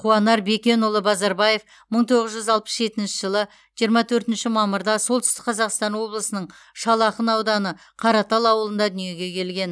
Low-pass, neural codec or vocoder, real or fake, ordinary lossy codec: none; none; real; none